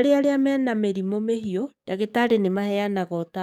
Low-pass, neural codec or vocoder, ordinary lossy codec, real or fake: 19.8 kHz; codec, 44.1 kHz, 7.8 kbps, DAC; none; fake